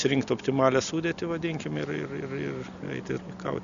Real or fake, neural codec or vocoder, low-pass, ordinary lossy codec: real; none; 7.2 kHz; MP3, 96 kbps